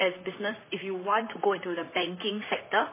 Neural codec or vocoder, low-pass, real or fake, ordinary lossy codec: vocoder, 44.1 kHz, 128 mel bands, Pupu-Vocoder; 3.6 kHz; fake; MP3, 16 kbps